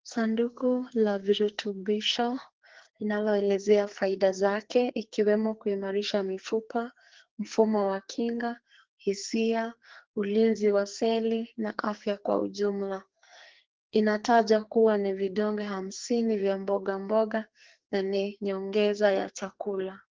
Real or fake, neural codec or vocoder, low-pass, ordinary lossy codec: fake; codec, 44.1 kHz, 2.6 kbps, SNAC; 7.2 kHz; Opus, 16 kbps